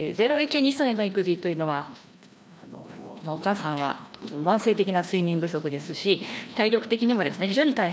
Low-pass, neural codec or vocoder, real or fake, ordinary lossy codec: none; codec, 16 kHz, 1 kbps, FreqCodec, larger model; fake; none